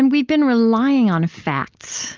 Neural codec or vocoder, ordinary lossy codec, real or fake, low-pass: none; Opus, 32 kbps; real; 7.2 kHz